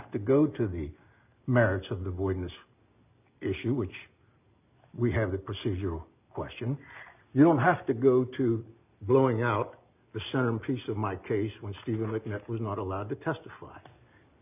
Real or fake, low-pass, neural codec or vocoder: real; 3.6 kHz; none